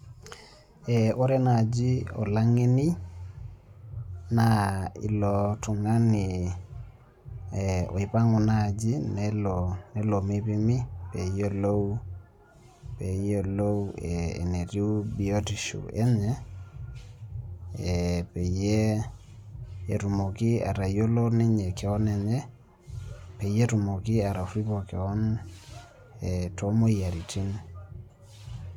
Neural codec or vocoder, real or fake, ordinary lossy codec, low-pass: none; real; none; 19.8 kHz